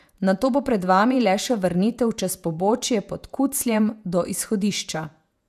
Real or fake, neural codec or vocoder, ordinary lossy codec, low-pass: fake; vocoder, 48 kHz, 128 mel bands, Vocos; none; 14.4 kHz